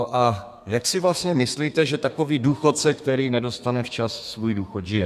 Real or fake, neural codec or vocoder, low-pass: fake; codec, 32 kHz, 1.9 kbps, SNAC; 14.4 kHz